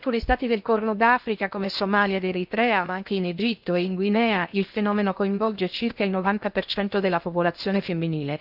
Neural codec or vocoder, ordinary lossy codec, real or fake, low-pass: codec, 16 kHz in and 24 kHz out, 0.8 kbps, FocalCodec, streaming, 65536 codes; none; fake; 5.4 kHz